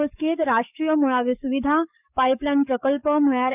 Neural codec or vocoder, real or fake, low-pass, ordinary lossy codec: codec, 44.1 kHz, 7.8 kbps, DAC; fake; 3.6 kHz; none